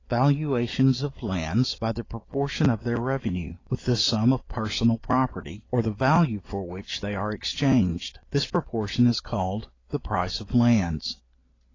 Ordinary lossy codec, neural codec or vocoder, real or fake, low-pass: AAC, 32 kbps; none; real; 7.2 kHz